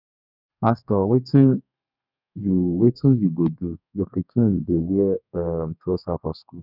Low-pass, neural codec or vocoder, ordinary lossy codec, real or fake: 5.4 kHz; codec, 32 kHz, 1.9 kbps, SNAC; none; fake